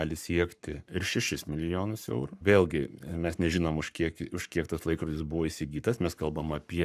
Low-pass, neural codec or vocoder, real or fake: 14.4 kHz; codec, 44.1 kHz, 7.8 kbps, Pupu-Codec; fake